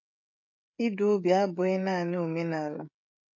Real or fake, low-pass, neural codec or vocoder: fake; 7.2 kHz; codec, 16 kHz, 16 kbps, FreqCodec, smaller model